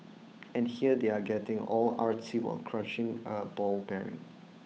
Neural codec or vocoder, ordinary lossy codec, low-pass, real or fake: codec, 16 kHz, 8 kbps, FunCodec, trained on Chinese and English, 25 frames a second; none; none; fake